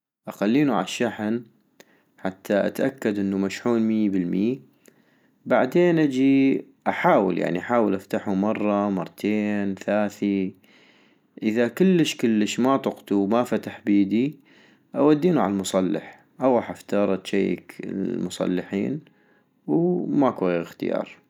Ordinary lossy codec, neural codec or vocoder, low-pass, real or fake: none; none; 19.8 kHz; real